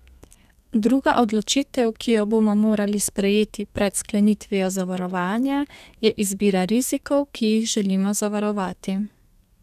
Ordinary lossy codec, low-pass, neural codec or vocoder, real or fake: none; 14.4 kHz; codec, 32 kHz, 1.9 kbps, SNAC; fake